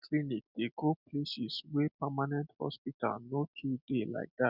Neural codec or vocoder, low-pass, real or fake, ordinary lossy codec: none; 5.4 kHz; real; none